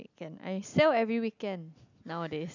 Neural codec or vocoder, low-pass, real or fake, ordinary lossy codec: none; 7.2 kHz; real; none